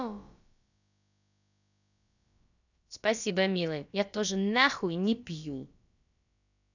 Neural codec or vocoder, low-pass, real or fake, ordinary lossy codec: codec, 16 kHz, about 1 kbps, DyCAST, with the encoder's durations; 7.2 kHz; fake; none